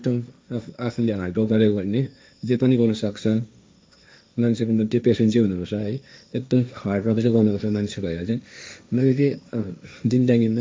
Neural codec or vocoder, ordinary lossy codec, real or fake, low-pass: codec, 16 kHz, 1.1 kbps, Voila-Tokenizer; none; fake; none